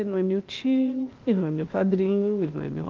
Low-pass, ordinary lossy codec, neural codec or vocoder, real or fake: 7.2 kHz; Opus, 24 kbps; codec, 16 kHz, 0.8 kbps, ZipCodec; fake